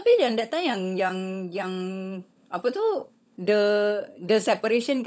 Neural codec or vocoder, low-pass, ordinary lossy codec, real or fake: codec, 16 kHz, 16 kbps, FunCodec, trained on LibriTTS, 50 frames a second; none; none; fake